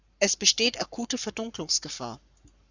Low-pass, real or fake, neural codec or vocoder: 7.2 kHz; fake; codec, 44.1 kHz, 7.8 kbps, Pupu-Codec